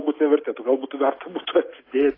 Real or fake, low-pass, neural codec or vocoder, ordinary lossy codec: real; 5.4 kHz; none; AAC, 24 kbps